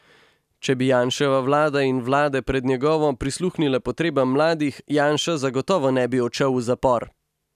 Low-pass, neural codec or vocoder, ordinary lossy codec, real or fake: 14.4 kHz; none; none; real